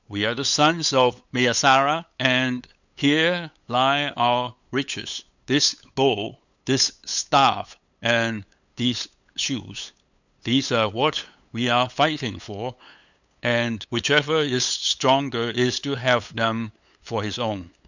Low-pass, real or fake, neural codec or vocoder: 7.2 kHz; fake; codec, 16 kHz, 8 kbps, FunCodec, trained on LibriTTS, 25 frames a second